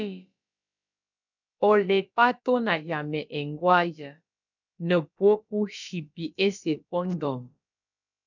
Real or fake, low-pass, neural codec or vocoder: fake; 7.2 kHz; codec, 16 kHz, about 1 kbps, DyCAST, with the encoder's durations